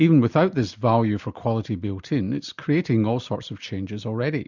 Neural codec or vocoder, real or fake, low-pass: none; real; 7.2 kHz